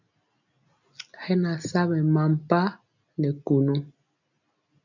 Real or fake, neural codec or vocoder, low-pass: real; none; 7.2 kHz